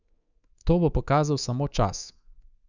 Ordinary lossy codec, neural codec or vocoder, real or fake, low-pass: none; codec, 24 kHz, 3.1 kbps, DualCodec; fake; 7.2 kHz